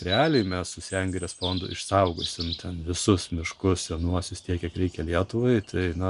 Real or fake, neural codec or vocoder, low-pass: real; none; 10.8 kHz